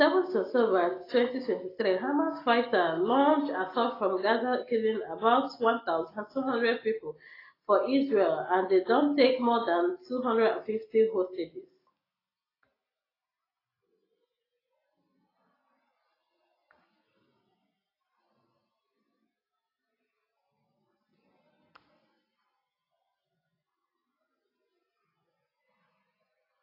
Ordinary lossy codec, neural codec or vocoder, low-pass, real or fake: AAC, 24 kbps; none; 5.4 kHz; real